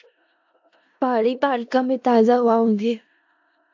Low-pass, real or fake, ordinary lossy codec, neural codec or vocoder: 7.2 kHz; fake; AAC, 48 kbps; codec, 16 kHz in and 24 kHz out, 0.4 kbps, LongCat-Audio-Codec, four codebook decoder